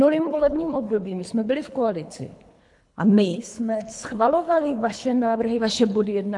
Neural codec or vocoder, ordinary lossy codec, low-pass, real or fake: codec, 24 kHz, 3 kbps, HILCodec; AAC, 64 kbps; 10.8 kHz; fake